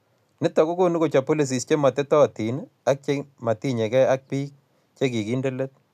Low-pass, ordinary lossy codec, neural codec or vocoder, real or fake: 14.4 kHz; none; none; real